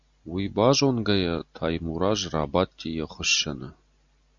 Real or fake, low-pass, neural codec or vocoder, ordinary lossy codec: real; 7.2 kHz; none; Opus, 64 kbps